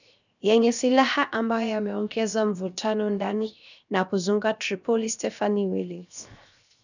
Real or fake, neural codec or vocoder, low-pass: fake; codec, 16 kHz, 0.7 kbps, FocalCodec; 7.2 kHz